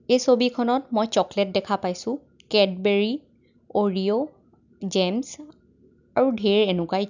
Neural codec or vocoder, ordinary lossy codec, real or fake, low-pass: none; none; real; 7.2 kHz